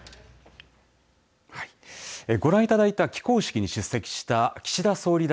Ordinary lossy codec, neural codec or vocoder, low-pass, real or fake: none; none; none; real